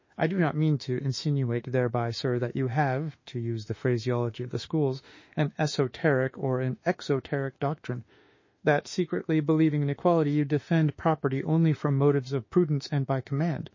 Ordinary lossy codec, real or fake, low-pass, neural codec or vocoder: MP3, 32 kbps; fake; 7.2 kHz; autoencoder, 48 kHz, 32 numbers a frame, DAC-VAE, trained on Japanese speech